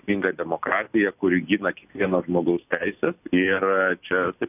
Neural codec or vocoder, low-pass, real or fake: none; 3.6 kHz; real